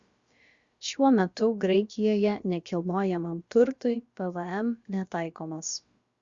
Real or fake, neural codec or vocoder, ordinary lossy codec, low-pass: fake; codec, 16 kHz, about 1 kbps, DyCAST, with the encoder's durations; Opus, 64 kbps; 7.2 kHz